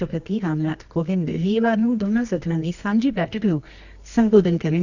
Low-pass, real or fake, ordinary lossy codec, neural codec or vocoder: 7.2 kHz; fake; none; codec, 24 kHz, 0.9 kbps, WavTokenizer, medium music audio release